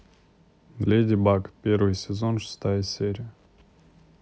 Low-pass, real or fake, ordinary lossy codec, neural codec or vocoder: none; real; none; none